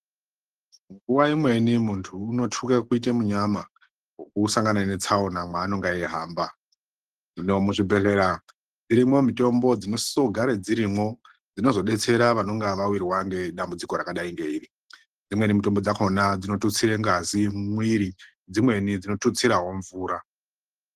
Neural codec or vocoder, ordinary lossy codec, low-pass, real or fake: none; Opus, 16 kbps; 14.4 kHz; real